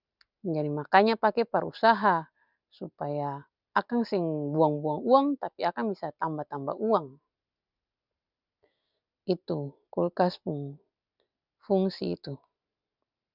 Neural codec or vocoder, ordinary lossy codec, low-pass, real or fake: none; none; 5.4 kHz; real